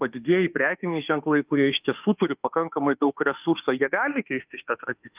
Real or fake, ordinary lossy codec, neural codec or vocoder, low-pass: fake; Opus, 24 kbps; autoencoder, 48 kHz, 32 numbers a frame, DAC-VAE, trained on Japanese speech; 3.6 kHz